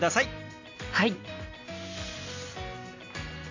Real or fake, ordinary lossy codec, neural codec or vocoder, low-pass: real; AAC, 48 kbps; none; 7.2 kHz